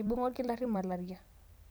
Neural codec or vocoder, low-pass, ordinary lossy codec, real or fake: vocoder, 44.1 kHz, 128 mel bands every 256 samples, BigVGAN v2; none; none; fake